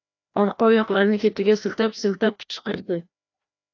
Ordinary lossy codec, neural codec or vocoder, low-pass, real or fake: AAC, 48 kbps; codec, 16 kHz, 1 kbps, FreqCodec, larger model; 7.2 kHz; fake